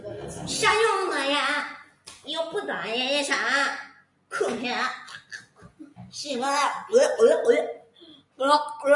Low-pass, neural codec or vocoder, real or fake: 10.8 kHz; none; real